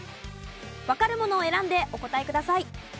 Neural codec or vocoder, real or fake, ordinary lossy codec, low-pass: none; real; none; none